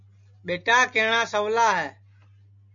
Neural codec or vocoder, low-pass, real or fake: none; 7.2 kHz; real